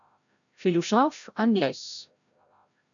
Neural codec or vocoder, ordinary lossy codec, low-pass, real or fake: codec, 16 kHz, 0.5 kbps, FreqCodec, larger model; MP3, 96 kbps; 7.2 kHz; fake